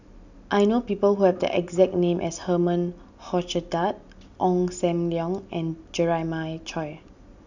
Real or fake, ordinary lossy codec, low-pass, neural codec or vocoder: real; none; 7.2 kHz; none